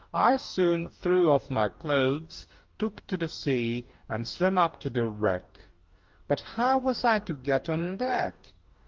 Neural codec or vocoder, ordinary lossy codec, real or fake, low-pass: codec, 44.1 kHz, 2.6 kbps, DAC; Opus, 32 kbps; fake; 7.2 kHz